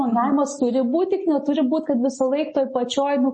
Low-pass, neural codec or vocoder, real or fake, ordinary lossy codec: 10.8 kHz; none; real; MP3, 32 kbps